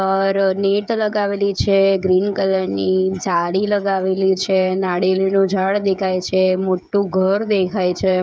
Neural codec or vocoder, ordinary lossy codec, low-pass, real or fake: codec, 16 kHz, 16 kbps, FreqCodec, smaller model; none; none; fake